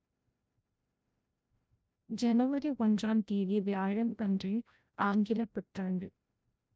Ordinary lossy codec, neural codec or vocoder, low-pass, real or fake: none; codec, 16 kHz, 0.5 kbps, FreqCodec, larger model; none; fake